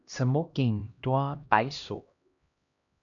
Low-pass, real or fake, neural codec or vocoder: 7.2 kHz; fake; codec, 16 kHz, 1 kbps, X-Codec, HuBERT features, trained on LibriSpeech